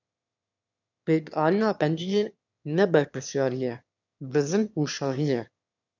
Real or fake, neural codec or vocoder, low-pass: fake; autoencoder, 22.05 kHz, a latent of 192 numbers a frame, VITS, trained on one speaker; 7.2 kHz